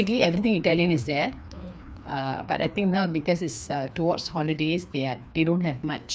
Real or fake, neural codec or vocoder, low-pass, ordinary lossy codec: fake; codec, 16 kHz, 2 kbps, FreqCodec, larger model; none; none